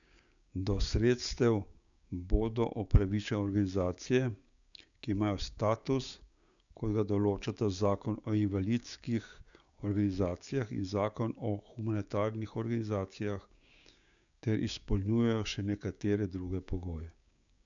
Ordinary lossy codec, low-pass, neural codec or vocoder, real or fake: none; 7.2 kHz; codec, 16 kHz, 6 kbps, DAC; fake